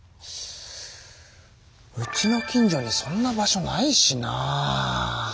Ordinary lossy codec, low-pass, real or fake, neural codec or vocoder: none; none; real; none